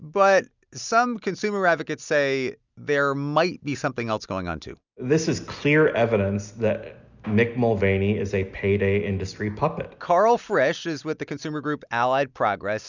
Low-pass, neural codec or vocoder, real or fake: 7.2 kHz; none; real